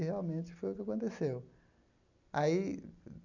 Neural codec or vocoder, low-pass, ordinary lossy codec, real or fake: none; 7.2 kHz; none; real